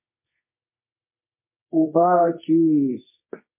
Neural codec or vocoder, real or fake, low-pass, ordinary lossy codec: codec, 24 kHz, 0.9 kbps, WavTokenizer, medium music audio release; fake; 3.6 kHz; MP3, 32 kbps